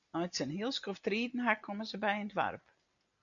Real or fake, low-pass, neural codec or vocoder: real; 7.2 kHz; none